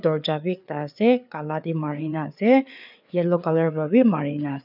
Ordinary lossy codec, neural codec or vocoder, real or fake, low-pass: none; codec, 16 kHz, 4 kbps, FreqCodec, larger model; fake; 5.4 kHz